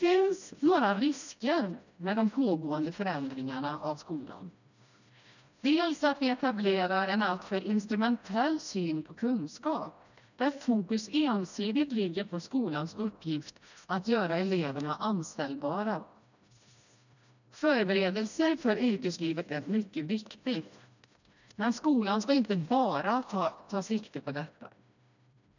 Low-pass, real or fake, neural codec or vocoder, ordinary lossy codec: 7.2 kHz; fake; codec, 16 kHz, 1 kbps, FreqCodec, smaller model; none